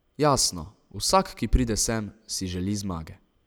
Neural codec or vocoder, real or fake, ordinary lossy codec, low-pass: none; real; none; none